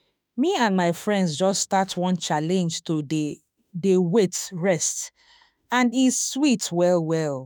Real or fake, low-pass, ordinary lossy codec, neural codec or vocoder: fake; none; none; autoencoder, 48 kHz, 32 numbers a frame, DAC-VAE, trained on Japanese speech